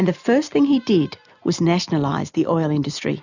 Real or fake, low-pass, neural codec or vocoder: real; 7.2 kHz; none